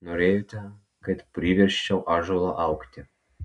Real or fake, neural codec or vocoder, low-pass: real; none; 10.8 kHz